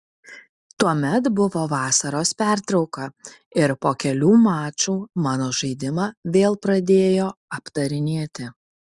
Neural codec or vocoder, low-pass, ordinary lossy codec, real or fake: none; 10.8 kHz; Opus, 64 kbps; real